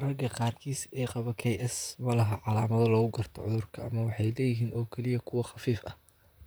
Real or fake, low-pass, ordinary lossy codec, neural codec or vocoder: fake; none; none; vocoder, 44.1 kHz, 128 mel bands, Pupu-Vocoder